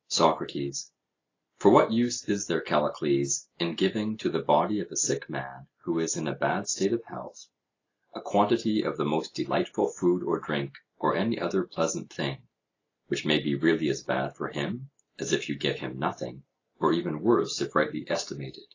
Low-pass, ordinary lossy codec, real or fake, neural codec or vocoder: 7.2 kHz; AAC, 32 kbps; real; none